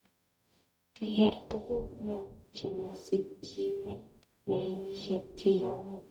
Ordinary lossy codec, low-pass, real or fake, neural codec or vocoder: none; 19.8 kHz; fake; codec, 44.1 kHz, 0.9 kbps, DAC